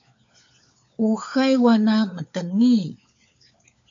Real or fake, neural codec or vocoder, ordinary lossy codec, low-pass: fake; codec, 16 kHz, 4 kbps, FunCodec, trained on LibriTTS, 50 frames a second; AAC, 64 kbps; 7.2 kHz